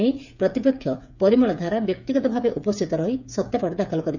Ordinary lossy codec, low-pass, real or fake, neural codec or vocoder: none; 7.2 kHz; fake; codec, 16 kHz, 8 kbps, FreqCodec, smaller model